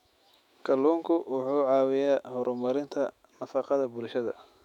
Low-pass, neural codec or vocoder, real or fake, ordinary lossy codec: 19.8 kHz; autoencoder, 48 kHz, 128 numbers a frame, DAC-VAE, trained on Japanese speech; fake; none